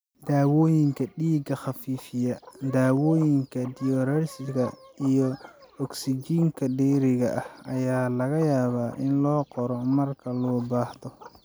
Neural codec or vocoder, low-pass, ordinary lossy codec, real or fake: none; none; none; real